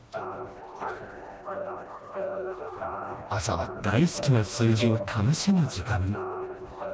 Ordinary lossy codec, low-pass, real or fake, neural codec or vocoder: none; none; fake; codec, 16 kHz, 1 kbps, FreqCodec, smaller model